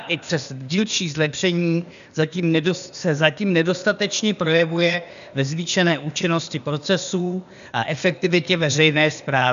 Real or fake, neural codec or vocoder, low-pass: fake; codec, 16 kHz, 0.8 kbps, ZipCodec; 7.2 kHz